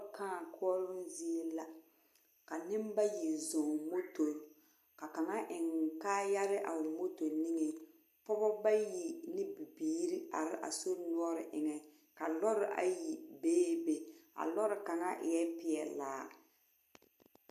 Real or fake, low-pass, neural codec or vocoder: real; 14.4 kHz; none